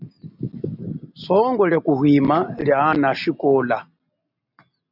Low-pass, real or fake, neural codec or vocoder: 5.4 kHz; real; none